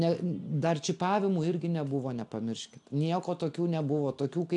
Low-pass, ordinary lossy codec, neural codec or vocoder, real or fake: 10.8 kHz; AAC, 64 kbps; none; real